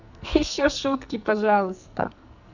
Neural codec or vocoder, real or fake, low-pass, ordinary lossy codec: codec, 44.1 kHz, 2.6 kbps, SNAC; fake; 7.2 kHz; none